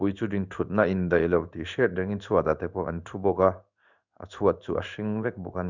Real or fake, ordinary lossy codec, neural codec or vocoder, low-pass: fake; none; codec, 16 kHz in and 24 kHz out, 1 kbps, XY-Tokenizer; 7.2 kHz